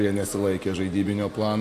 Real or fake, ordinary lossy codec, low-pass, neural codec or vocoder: real; AAC, 64 kbps; 14.4 kHz; none